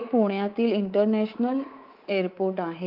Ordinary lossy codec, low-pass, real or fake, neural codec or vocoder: Opus, 32 kbps; 5.4 kHz; fake; codec, 24 kHz, 3.1 kbps, DualCodec